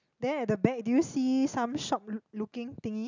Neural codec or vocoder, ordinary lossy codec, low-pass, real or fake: none; none; 7.2 kHz; real